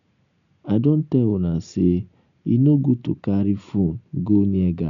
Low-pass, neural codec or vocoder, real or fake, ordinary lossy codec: 7.2 kHz; none; real; none